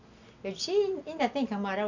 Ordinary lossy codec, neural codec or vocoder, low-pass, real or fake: none; none; 7.2 kHz; real